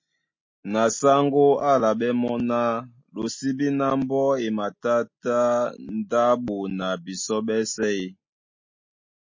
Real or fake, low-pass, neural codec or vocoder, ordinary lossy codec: real; 7.2 kHz; none; MP3, 32 kbps